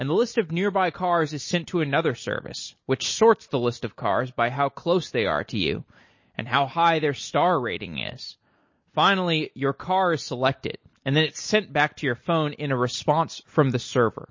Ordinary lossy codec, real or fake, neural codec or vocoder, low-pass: MP3, 32 kbps; real; none; 7.2 kHz